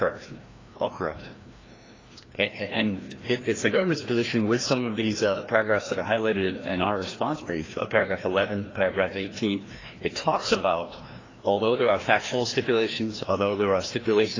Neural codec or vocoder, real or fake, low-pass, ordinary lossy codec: codec, 16 kHz, 1 kbps, FreqCodec, larger model; fake; 7.2 kHz; AAC, 32 kbps